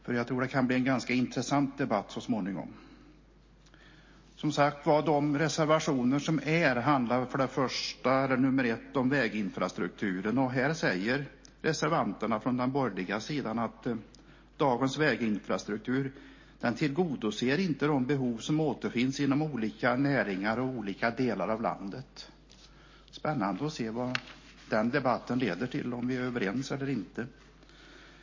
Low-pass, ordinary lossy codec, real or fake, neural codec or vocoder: 7.2 kHz; MP3, 32 kbps; real; none